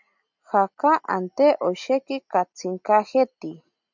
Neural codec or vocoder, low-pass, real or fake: none; 7.2 kHz; real